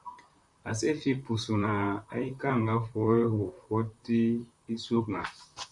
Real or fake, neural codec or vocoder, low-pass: fake; vocoder, 44.1 kHz, 128 mel bands, Pupu-Vocoder; 10.8 kHz